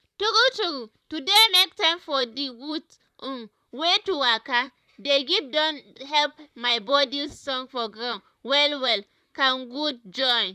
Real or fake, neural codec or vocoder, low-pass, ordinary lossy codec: fake; vocoder, 44.1 kHz, 128 mel bands, Pupu-Vocoder; 14.4 kHz; none